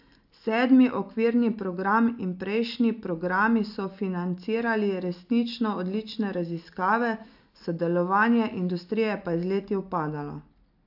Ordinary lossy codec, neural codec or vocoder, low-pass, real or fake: AAC, 48 kbps; none; 5.4 kHz; real